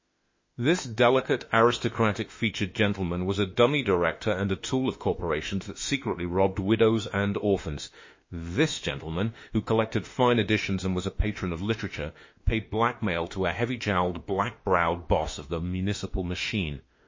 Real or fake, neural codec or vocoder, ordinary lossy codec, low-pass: fake; autoencoder, 48 kHz, 32 numbers a frame, DAC-VAE, trained on Japanese speech; MP3, 32 kbps; 7.2 kHz